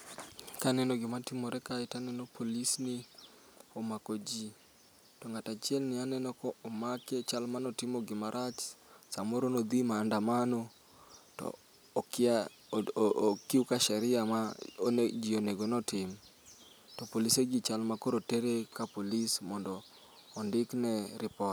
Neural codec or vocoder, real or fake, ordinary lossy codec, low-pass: none; real; none; none